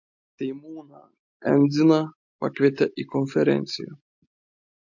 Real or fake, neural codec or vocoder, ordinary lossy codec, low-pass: real; none; MP3, 48 kbps; 7.2 kHz